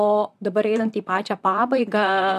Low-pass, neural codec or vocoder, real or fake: 14.4 kHz; vocoder, 44.1 kHz, 128 mel bands, Pupu-Vocoder; fake